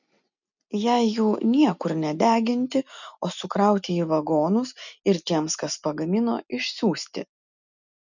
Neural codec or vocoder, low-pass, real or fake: none; 7.2 kHz; real